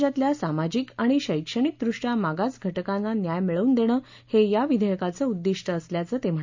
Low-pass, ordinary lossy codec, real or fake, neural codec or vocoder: 7.2 kHz; MP3, 64 kbps; real; none